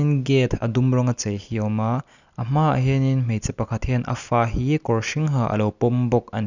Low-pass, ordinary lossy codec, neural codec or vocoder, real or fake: 7.2 kHz; none; none; real